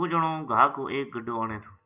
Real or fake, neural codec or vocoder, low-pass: real; none; 3.6 kHz